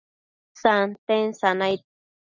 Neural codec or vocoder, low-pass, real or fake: none; 7.2 kHz; real